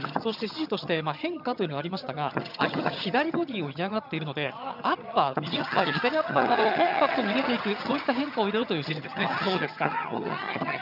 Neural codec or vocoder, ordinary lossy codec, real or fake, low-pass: vocoder, 22.05 kHz, 80 mel bands, HiFi-GAN; none; fake; 5.4 kHz